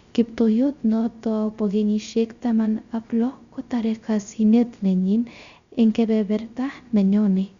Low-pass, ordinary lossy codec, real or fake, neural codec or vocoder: 7.2 kHz; Opus, 64 kbps; fake; codec, 16 kHz, 0.3 kbps, FocalCodec